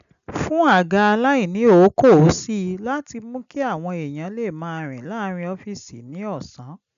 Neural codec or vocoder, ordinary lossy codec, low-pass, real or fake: none; none; 7.2 kHz; real